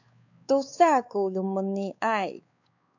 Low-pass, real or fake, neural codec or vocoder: 7.2 kHz; fake; codec, 16 kHz, 4 kbps, X-Codec, WavLM features, trained on Multilingual LibriSpeech